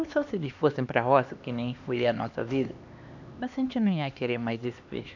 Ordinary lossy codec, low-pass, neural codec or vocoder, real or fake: none; 7.2 kHz; codec, 16 kHz, 2 kbps, X-Codec, HuBERT features, trained on LibriSpeech; fake